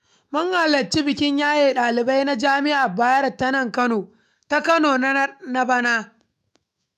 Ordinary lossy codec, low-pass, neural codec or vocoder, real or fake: none; 14.4 kHz; autoencoder, 48 kHz, 128 numbers a frame, DAC-VAE, trained on Japanese speech; fake